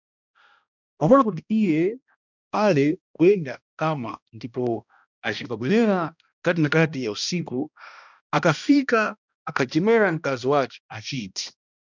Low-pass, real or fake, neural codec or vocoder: 7.2 kHz; fake; codec, 16 kHz, 1 kbps, X-Codec, HuBERT features, trained on balanced general audio